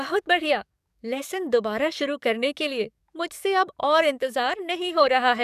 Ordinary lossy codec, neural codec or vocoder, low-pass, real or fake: none; codec, 44.1 kHz, 7.8 kbps, DAC; 14.4 kHz; fake